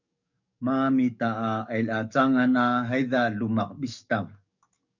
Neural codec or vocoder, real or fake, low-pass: codec, 44.1 kHz, 7.8 kbps, DAC; fake; 7.2 kHz